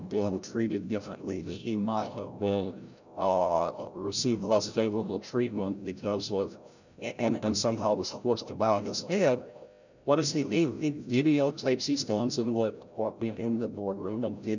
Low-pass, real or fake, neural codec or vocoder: 7.2 kHz; fake; codec, 16 kHz, 0.5 kbps, FreqCodec, larger model